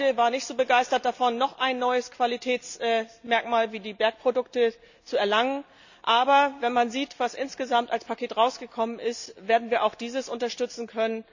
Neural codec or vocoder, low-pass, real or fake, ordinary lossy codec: none; 7.2 kHz; real; none